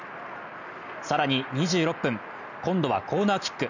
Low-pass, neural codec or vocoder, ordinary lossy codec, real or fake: 7.2 kHz; none; none; real